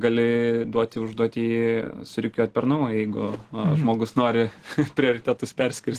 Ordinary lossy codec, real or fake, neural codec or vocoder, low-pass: Opus, 16 kbps; real; none; 14.4 kHz